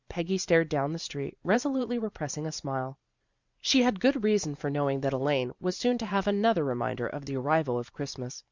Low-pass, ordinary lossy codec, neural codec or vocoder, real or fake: 7.2 kHz; Opus, 64 kbps; vocoder, 44.1 kHz, 128 mel bands every 512 samples, BigVGAN v2; fake